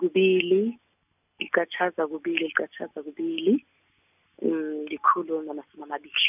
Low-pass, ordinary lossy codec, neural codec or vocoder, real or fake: 3.6 kHz; none; none; real